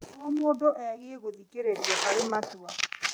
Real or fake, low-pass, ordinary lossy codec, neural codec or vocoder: fake; none; none; vocoder, 44.1 kHz, 128 mel bands every 512 samples, BigVGAN v2